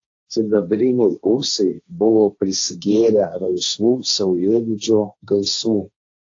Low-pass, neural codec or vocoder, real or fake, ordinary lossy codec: 7.2 kHz; codec, 16 kHz, 1.1 kbps, Voila-Tokenizer; fake; AAC, 48 kbps